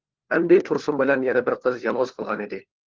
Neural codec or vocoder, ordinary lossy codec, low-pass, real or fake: codec, 16 kHz, 4 kbps, FunCodec, trained on LibriTTS, 50 frames a second; Opus, 16 kbps; 7.2 kHz; fake